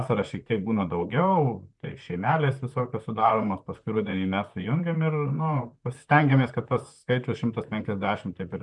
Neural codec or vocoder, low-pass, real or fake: vocoder, 44.1 kHz, 128 mel bands, Pupu-Vocoder; 10.8 kHz; fake